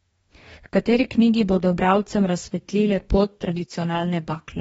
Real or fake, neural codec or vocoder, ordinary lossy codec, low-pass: fake; codec, 44.1 kHz, 2.6 kbps, DAC; AAC, 24 kbps; 19.8 kHz